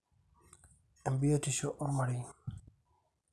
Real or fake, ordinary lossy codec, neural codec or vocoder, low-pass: real; none; none; none